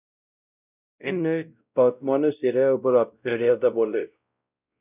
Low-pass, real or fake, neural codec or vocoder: 3.6 kHz; fake; codec, 16 kHz, 0.5 kbps, X-Codec, WavLM features, trained on Multilingual LibriSpeech